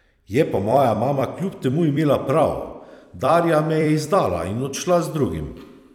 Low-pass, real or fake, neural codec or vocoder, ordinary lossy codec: 19.8 kHz; fake; vocoder, 44.1 kHz, 128 mel bands every 256 samples, BigVGAN v2; none